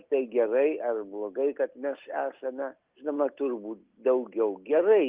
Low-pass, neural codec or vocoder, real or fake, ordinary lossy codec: 3.6 kHz; none; real; Opus, 32 kbps